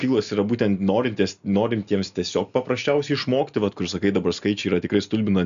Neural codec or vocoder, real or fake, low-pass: none; real; 7.2 kHz